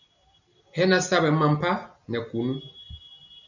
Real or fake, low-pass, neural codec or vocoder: real; 7.2 kHz; none